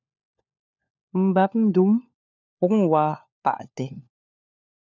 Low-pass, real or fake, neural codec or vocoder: 7.2 kHz; fake; codec, 16 kHz, 4 kbps, FunCodec, trained on LibriTTS, 50 frames a second